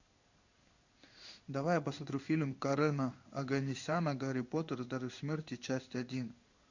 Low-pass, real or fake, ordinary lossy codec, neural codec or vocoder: 7.2 kHz; fake; Opus, 64 kbps; codec, 16 kHz in and 24 kHz out, 1 kbps, XY-Tokenizer